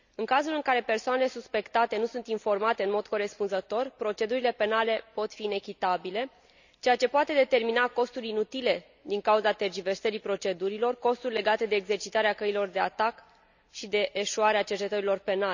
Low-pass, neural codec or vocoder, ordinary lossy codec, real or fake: 7.2 kHz; none; none; real